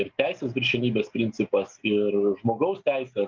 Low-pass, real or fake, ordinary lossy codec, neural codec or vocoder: 7.2 kHz; real; Opus, 32 kbps; none